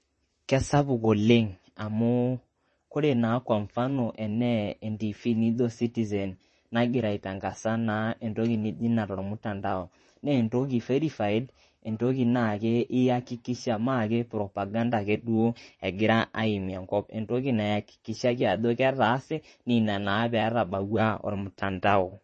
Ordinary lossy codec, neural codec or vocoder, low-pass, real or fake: MP3, 32 kbps; vocoder, 44.1 kHz, 128 mel bands, Pupu-Vocoder; 10.8 kHz; fake